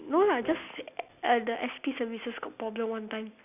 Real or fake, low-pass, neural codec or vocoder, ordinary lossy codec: real; 3.6 kHz; none; none